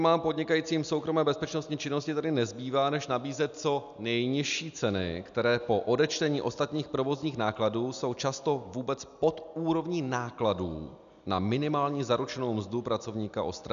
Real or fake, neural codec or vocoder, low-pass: real; none; 7.2 kHz